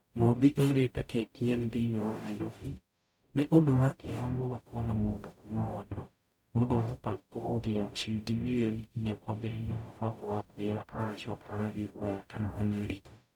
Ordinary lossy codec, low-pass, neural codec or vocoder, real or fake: none; 19.8 kHz; codec, 44.1 kHz, 0.9 kbps, DAC; fake